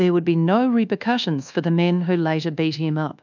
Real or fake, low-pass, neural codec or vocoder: fake; 7.2 kHz; codec, 24 kHz, 1.2 kbps, DualCodec